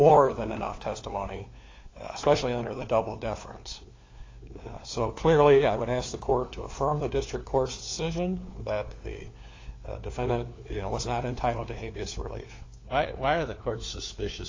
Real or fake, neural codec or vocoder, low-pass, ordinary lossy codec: fake; codec, 16 kHz, 4 kbps, FunCodec, trained on LibriTTS, 50 frames a second; 7.2 kHz; AAC, 32 kbps